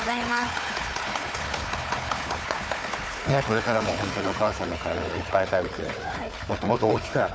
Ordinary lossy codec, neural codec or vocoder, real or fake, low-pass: none; codec, 16 kHz, 4 kbps, FunCodec, trained on Chinese and English, 50 frames a second; fake; none